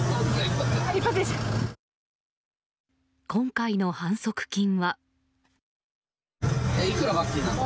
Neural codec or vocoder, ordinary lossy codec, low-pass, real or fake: none; none; none; real